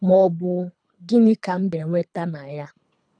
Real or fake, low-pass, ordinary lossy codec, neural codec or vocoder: fake; 9.9 kHz; none; codec, 24 kHz, 3 kbps, HILCodec